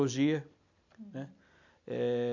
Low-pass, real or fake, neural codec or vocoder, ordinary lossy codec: 7.2 kHz; real; none; none